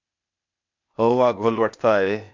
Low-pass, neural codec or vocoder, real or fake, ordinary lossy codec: 7.2 kHz; codec, 16 kHz, 0.8 kbps, ZipCodec; fake; MP3, 48 kbps